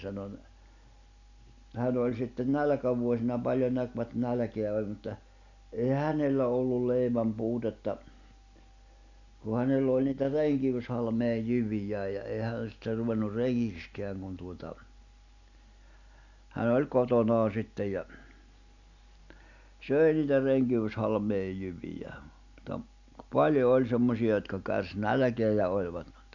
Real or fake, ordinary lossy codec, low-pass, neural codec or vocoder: real; none; 7.2 kHz; none